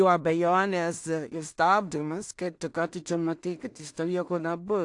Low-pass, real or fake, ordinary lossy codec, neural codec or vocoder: 10.8 kHz; fake; AAC, 64 kbps; codec, 16 kHz in and 24 kHz out, 0.4 kbps, LongCat-Audio-Codec, two codebook decoder